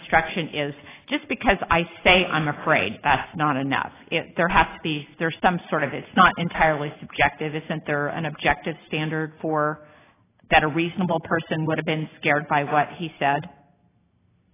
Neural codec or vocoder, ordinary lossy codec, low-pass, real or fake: none; AAC, 16 kbps; 3.6 kHz; real